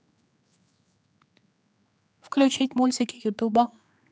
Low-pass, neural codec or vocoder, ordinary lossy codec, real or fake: none; codec, 16 kHz, 4 kbps, X-Codec, HuBERT features, trained on general audio; none; fake